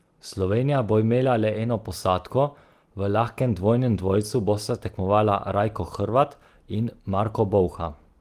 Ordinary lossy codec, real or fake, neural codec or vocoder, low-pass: Opus, 24 kbps; real; none; 14.4 kHz